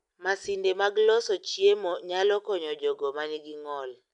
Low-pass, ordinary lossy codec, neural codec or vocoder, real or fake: 10.8 kHz; none; none; real